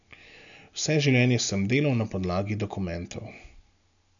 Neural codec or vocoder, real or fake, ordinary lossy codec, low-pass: none; real; none; 7.2 kHz